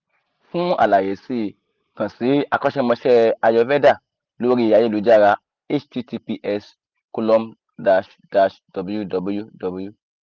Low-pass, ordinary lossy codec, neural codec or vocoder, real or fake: 7.2 kHz; Opus, 32 kbps; none; real